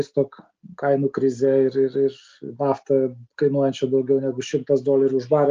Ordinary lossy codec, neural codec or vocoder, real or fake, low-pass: Opus, 24 kbps; none; real; 7.2 kHz